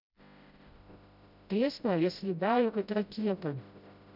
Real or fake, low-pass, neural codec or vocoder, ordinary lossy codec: fake; 5.4 kHz; codec, 16 kHz, 0.5 kbps, FreqCodec, smaller model; none